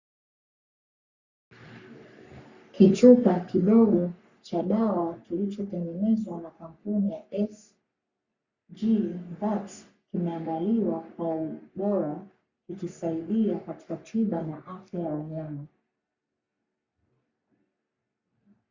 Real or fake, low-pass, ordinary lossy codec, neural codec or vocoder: fake; 7.2 kHz; Opus, 64 kbps; codec, 44.1 kHz, 3.4 kbps, Pupu-Codec